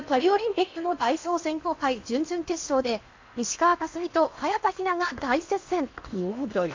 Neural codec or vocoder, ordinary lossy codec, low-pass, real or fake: codec, 16 kHz in and 24 kHz out, 0.8 kbps, FocalCodec, streaming, 65536 codes; MP3, 64 kbps; 7.2 kHz; fake